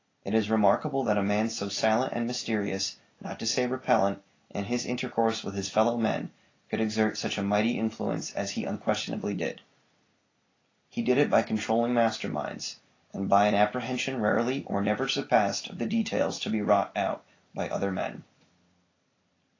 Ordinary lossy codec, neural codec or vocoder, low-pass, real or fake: AAC, 32 kbps; none; 7.2 kHz; real